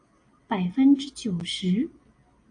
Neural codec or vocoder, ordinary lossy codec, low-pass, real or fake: none; AAC, 48 kbps; 9.9 kHz; real